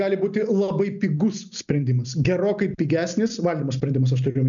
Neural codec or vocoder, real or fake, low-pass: none; real; 7.2 kHz